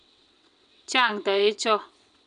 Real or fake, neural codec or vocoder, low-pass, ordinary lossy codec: fake; vocoder, 22.05 kHz, 80 mel bands, WaveNeXt; 9.9 kHz; none